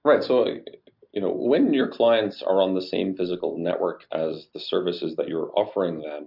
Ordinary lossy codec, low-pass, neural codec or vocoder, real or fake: MP3, 48 kbps; 5.4 kHz; none; real